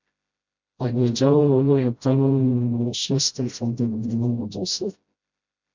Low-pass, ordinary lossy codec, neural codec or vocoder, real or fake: 7.2 kHz; MP3, 64 kbps; codec, 16 kHz, 0.5 kbps, FreqCodec, smaller model; fake